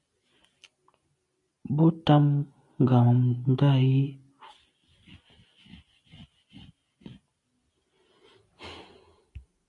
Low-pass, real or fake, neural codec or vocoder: 10.8 kHz; real; none